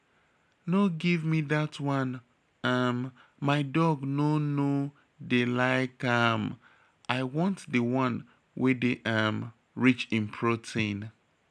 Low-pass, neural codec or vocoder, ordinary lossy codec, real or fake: none; none; none; real